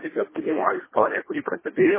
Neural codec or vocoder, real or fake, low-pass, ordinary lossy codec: codec, 24 kHz, 1.5 kbps, HILCodec; fake; 3.6 kHz; MP3, 16 kbps